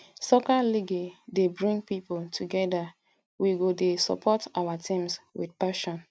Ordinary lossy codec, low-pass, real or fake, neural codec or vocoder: none; none; real; none